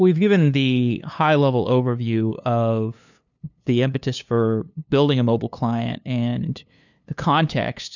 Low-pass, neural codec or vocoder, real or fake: 7.2 kHz; codec, 16 kHz, 2 kbps, FunCodec, trained on LibriTTS, 25 frames a second; fake